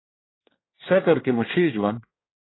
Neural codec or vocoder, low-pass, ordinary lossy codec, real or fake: codec, 24 kHz, 1 kbps, SNAC; 7.2 kHz; AAC, 16 kbps; fake